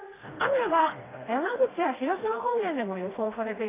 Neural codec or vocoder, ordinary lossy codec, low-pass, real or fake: codec, 16 kHz, 1 kbps, FreqCodec, smaller model; AAC, 16 kbps; 3.6 kHz; fake